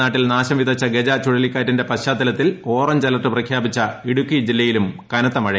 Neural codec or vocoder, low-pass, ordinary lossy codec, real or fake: none; none; none; real